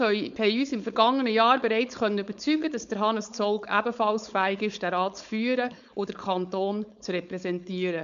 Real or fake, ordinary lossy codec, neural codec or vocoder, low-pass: fake; none; codec, 16 kHz, 4.8 kbps, FACodec; 7.2 kHz